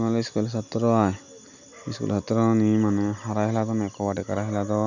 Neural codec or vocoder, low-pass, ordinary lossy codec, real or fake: none; 7.2 kHz; none; real